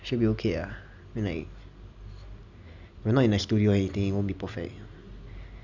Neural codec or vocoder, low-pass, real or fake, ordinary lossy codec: none; 7.2 kHz; real; none